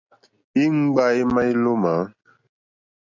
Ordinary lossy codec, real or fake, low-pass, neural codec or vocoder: AAC, 48 kbps; real; 7.2 kHz; none